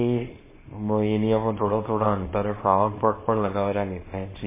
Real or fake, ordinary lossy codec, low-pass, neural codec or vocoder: fake; MP3, 16 kbps; 3.6 kHz; codec, 24 kHz, 0.9 kbps, WavTokenizer, small release